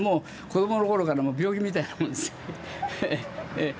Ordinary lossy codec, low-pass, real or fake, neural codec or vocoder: none; none; real; none